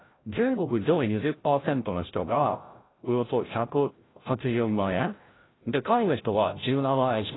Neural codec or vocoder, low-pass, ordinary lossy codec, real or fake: codec, 16 kHz, 0.5 kbps, FreqCodec, larger model; 7.2 kHz; AAC, 16 kbps; fake